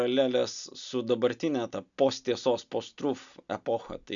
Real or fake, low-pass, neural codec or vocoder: real; 7.2 kHz; none